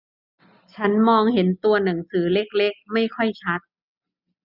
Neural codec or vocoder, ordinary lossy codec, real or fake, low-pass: none; none; real; 5.4 kHz